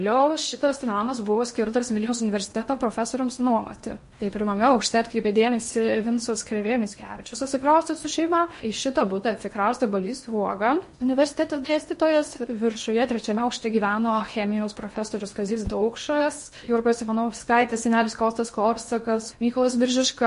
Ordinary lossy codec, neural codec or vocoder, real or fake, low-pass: MP3, 48 kbps; codec, 16 kHz in and 24 kHz out, 0.8 kbps, FocalCodec, streaming, 65536 codes; fake; 10.8 kHz